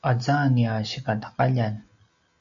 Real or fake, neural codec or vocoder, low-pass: real; none; 7.2 kHz